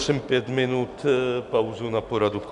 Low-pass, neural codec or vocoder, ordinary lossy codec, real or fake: 10.8 kHz; none; MP3, 96 kbps; real